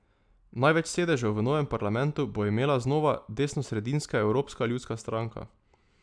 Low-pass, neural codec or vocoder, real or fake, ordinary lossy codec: 9.9 kHz; none; real; none